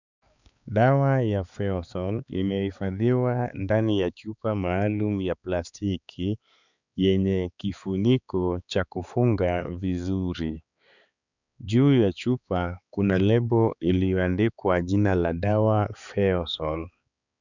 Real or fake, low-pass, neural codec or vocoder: fake; 7.2 kHz; codec, 16 kHz, 4 kbps, X-Codec, HuBERT features, trained on balanced general audio